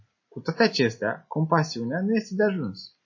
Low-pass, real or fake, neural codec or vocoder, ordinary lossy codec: 7.2 kHz; real; none; MP3, 32 kbps